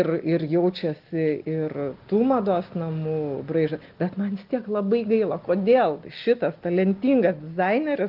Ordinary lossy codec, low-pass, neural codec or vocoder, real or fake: Opus, 32 kbps; 5.4 kHz; none; real